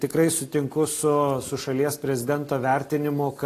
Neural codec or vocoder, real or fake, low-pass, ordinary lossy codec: none; real; 14.4 kHz; AAC, 48 kbps